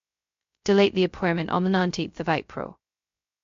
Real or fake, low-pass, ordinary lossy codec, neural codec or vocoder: fake; 7.2 kHz; MP3, 48 kbps; codec, 16 kHz, 0.2 kbps, FocalCodec